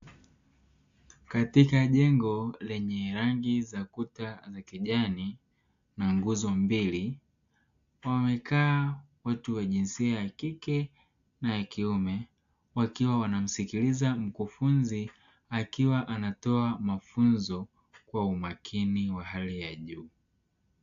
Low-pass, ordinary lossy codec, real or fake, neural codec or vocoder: 7.2 kHz; AAC, 64 kbps; real; none